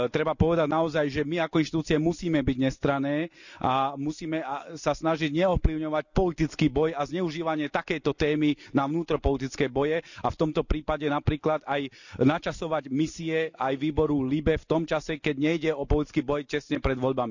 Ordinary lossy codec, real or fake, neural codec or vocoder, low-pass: none; real; none; 7.2 kHz